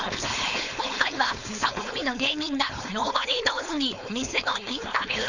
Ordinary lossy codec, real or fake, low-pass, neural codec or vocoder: none; fake; 7.2 kHz; codec, 16 kHz, 4.8 kbps, FACodec